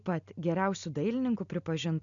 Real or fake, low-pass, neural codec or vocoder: real; 7.2 kHz; none